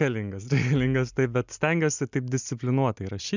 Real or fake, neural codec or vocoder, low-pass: real; none; 7.2 kHz